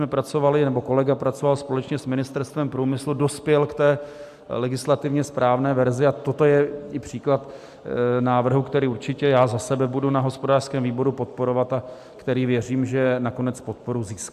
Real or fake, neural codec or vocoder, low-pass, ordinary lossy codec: real; none; 14.4 kHz; AAC, 96 kbps